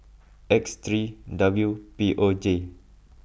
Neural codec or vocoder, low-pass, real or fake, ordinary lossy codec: none; none; real; none